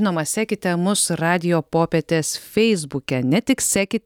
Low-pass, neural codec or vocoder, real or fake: 19.8 kHz; none; real